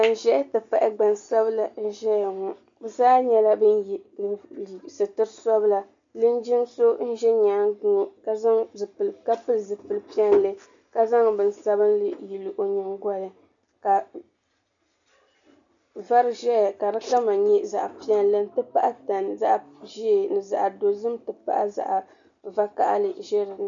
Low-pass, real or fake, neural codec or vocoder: 7.2 kHz; real; none